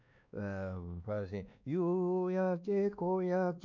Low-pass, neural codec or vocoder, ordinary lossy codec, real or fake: 7.2 kHz; codec, 16 kHz, 2 kbps, X-Codec, WavLM features, trained on Multilingual LibriSpeech; none; fake